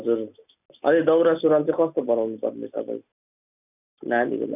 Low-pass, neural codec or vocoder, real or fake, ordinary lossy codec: 3.6 kHz; none; real; none